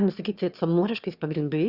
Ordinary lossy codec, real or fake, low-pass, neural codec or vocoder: Opus, 64 kbps; fake; 5.4 kHz; autoencoder, 22.05 kHz, a latent of 192 numbers a frame, VITS, trained on one speaker